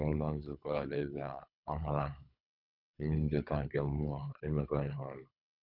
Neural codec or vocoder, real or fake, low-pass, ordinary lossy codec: codec, 24 kHz, 3 kbps, HILCodec; fake; 5.4 kHz; none